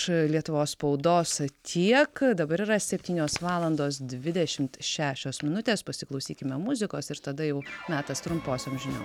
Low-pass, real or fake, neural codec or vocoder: 19.8 kHz; real; none